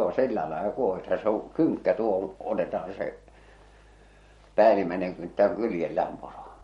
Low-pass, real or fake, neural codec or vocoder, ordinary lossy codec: 19.8 kHz; fake; vocoder, 44.1 kHz, 128 mel bands every 512 samples, BigVGAN v2; MP3, 48 kbps